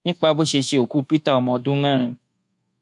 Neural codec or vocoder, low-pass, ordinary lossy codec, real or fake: codec, 24 kHz, 1.2 kbps, DualCodec; 10.8 kHz; none; fake